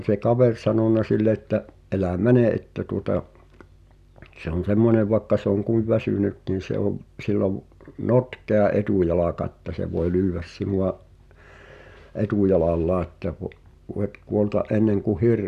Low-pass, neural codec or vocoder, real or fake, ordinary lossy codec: 14.4 kHz; none; real; none